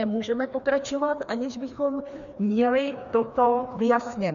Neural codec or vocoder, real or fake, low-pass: codec, 16 kHz, 2 kbps, FreqCodec, larger model; fake; 7.2 kHz